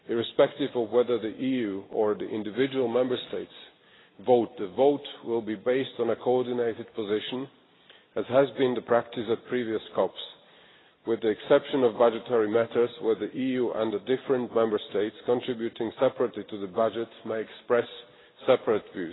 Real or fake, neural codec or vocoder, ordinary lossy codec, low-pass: real; none; AAC, 16 kbps; 7.2 kHz